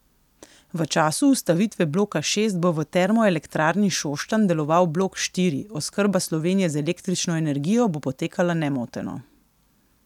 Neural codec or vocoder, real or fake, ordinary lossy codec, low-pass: none; real; none; 19.8 kHz